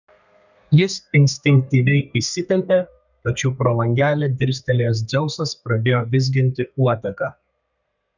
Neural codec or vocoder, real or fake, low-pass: codec, 32 kHz, 1.9 kbps, SNAC; fake; 7.2 kHz